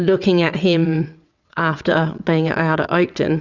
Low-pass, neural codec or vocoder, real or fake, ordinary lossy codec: 7.2 kHz; vocoder, 22.05 kHz, 80 mel bands, WaveNeXt; fake; Opus, 64 kbps